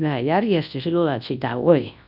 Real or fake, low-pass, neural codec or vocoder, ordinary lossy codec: fake; 5.4 kHz; codec, 24 kHz, 0.9 kbps, WavTokenizer, large speech release; none